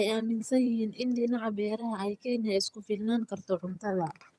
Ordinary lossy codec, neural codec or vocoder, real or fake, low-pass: none; vocoder, 22.05 kHz, 80 mel bands, HiFi-GAN; fake; none